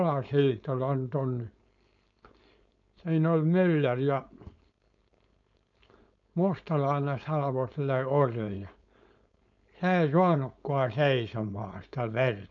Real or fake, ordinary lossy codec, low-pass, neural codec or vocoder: fake; none; 7.2 kHz; codec, 16 kHz, 4.8 kbps, FACodec